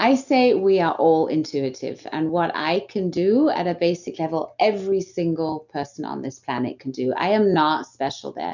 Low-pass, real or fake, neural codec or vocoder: 7.2 kHz; real; none